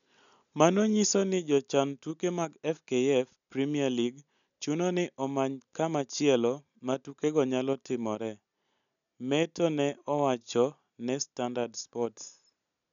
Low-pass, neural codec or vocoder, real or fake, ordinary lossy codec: 7.2 kHz; none; real; none